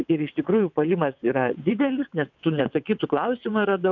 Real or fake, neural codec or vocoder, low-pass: fake; vocoder, 22.05 kHz, 80 mel bands, WaveNeXt; 7.2 kHz